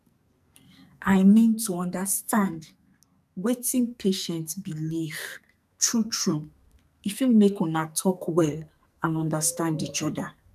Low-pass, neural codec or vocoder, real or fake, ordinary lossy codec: 14.4 kHz; codec, 44.1 kHz, 2.6 kbps, SNAC; fake; none